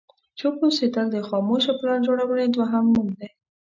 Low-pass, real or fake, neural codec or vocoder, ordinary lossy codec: 7.2 kHz; real; none; MP3, 64 kbps